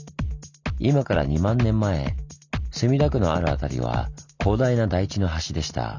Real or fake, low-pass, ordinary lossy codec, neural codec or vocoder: real; 7.2 kHz; none; none